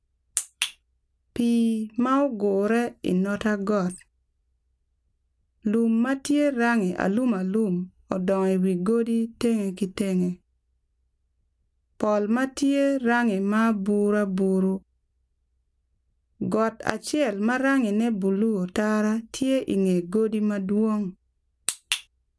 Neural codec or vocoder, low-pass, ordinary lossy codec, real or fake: none; none; none; real